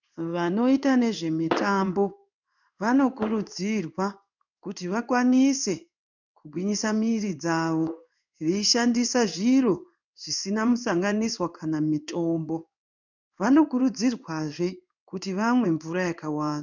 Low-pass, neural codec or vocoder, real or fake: 7.2 kHz; codec, 16 kHz in and 24 kHz out, 1 kbps, XY-Tokenizer; fake